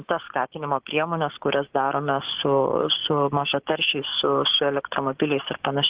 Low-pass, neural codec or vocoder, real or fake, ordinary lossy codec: 3.6 kHz; codec, 16 kHz, 6 kbps, DAC; fake; Opus, 16 kbps